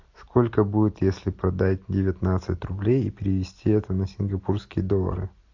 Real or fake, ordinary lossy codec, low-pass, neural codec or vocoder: real; AAC, 48 kbps; 7.2 kHz; none